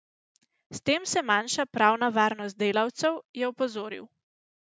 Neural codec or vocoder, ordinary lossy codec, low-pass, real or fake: none; none; none; real